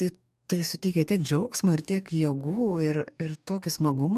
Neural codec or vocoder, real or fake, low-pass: codec, 44.1 kHz, 2.6 kbps, DAC; fake; 14.4 kHz